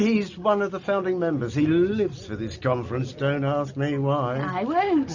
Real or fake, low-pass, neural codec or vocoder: real; 7.2 kHz; none